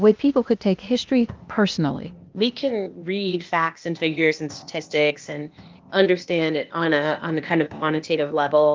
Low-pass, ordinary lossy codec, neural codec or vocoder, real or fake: 7.2 kHz; Opus, 32 kbps; codec, 16 kHz, 0.8 kbps, ZipCodec; fake